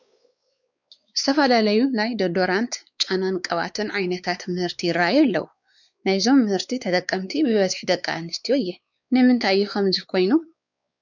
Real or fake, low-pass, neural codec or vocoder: fake; 7.2 kHz; codec, 16 kHz, 4 kbps, X-Codec, WavLM features, trained on Multilingual LibriSpeech